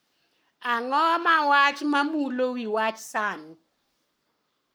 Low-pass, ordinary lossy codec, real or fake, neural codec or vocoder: none; none; fake; codec, 44.1 kHz, 7.8 kbps, Pupu-Codec